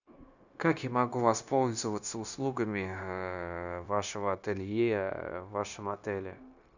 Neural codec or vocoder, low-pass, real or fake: codec, 16 kHz, 0.9 kbps, LongCat-Audio-Codec; 7.2 kHz; fake